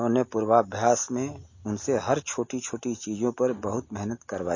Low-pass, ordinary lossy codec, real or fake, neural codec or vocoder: 7.2 kHz; MP3, 32 kbps; real; none